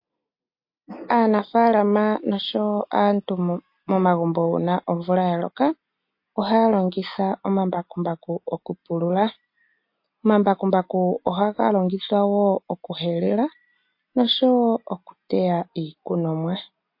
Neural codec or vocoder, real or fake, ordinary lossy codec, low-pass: none; real; MP3, 32 kbps; 5.4 kHz